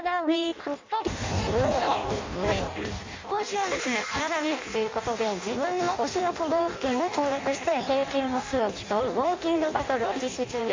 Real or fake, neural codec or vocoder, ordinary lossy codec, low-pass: fake; codec, 16 kHz in and 24 kHz out, 0.6 kbps, FireRedTTS-2 codec; MP3, 48 kbps; 7.2 kHz